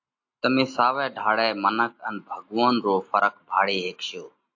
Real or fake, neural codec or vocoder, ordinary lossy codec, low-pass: real; none; AAC, 48 kbps; 7.2 kHz